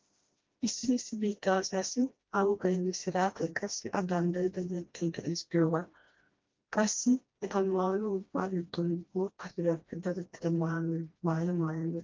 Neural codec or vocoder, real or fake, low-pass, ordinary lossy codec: codec, 16 kHz, 1 kbps, FreqCodec, smaller model; fake; 7.2 kHz; Opus, 32 kbps